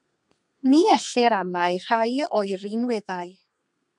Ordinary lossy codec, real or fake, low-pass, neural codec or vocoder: MP3, 96 kbps; fake; 10.8 kHz; codec, 32 kHz, 1.9 kbps, SNAC